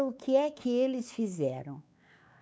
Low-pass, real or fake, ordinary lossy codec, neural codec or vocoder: none; fake; none; codec, 16 kHz, 4 kbps, X-Codec, WavLM features, trained on Multilingual LibriSpeech